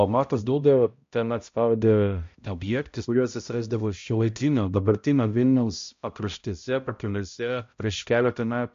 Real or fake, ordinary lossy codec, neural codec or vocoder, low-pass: fake; MP3, 48 kbps; codec, 16 kHz, 0.5 kbps, X-Codec, HuBERT features, trained on balanced general audio; 7.2 kHz